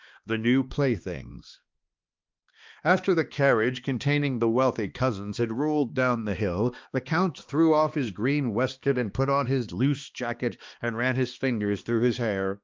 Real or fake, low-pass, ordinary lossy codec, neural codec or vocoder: fake; 7.2 kHz; Opus, 32 kbps; codec, 16 kHz, 2 kbps, X-Codec, HuBERT features, trained on balanced general audio